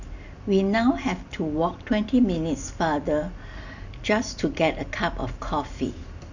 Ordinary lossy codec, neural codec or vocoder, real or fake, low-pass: none; none; real; 7.2 kHz